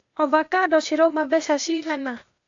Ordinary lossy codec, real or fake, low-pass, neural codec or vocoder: AAC, 64 kbps; fake; 7.2 kHz; codec, 16 kHz, 0.8 kbps, ZipCodec